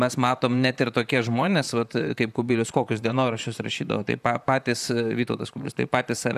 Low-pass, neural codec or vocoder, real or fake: 14.4 kHz; vocoder, 44.1 kHz, 128 mel bands, Pupu-Vocoder; fake